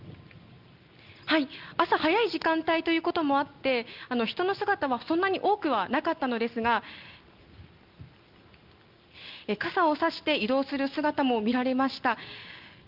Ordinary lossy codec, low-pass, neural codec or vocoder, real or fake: Opus, 24 kbps; 5.4 kHz; none; real